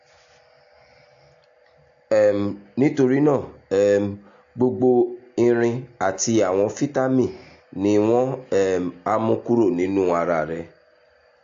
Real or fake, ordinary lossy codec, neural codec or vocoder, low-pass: real; AAC, 48 kbps; none; 7.2 kHz